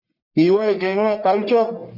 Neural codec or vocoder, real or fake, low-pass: codec, 44.1 kHz, 1.7 kbps, Pupu-Codec; fake; 5.4 kHz